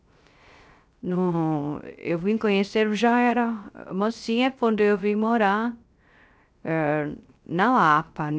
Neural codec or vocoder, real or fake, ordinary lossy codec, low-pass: codec, 16 kHz, 0.3 kbps, FocalCodec; fake; none; none